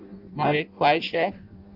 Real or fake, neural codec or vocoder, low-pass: fake; codec, 16 kHz in and 24 kHz out, 0.6 kbps, FireRedTTS-2 codec; 5.4 kHz